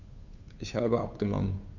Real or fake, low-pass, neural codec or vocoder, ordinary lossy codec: fake; 7.2 kHz; codec, 16 kHz, 2 kbps, FunCodec, trained on Chinese and English, 25 frames a second; none